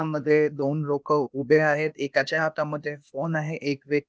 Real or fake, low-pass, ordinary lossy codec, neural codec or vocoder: fake; none; none; codec, 16 kHz, 0.8 kbps, ZipCodec